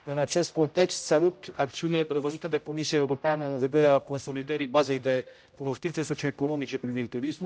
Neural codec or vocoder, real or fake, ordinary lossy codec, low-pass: codec, 16 kHz, 0.5 kbps, X-Codec, HuBERT features, trained on general audio; fake; none; none